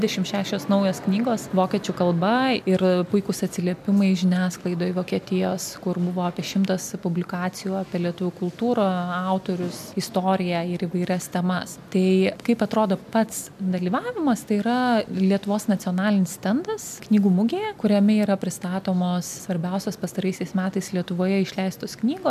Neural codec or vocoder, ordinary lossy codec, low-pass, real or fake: none; MP3, 96 kbps; 14.4 kHz; real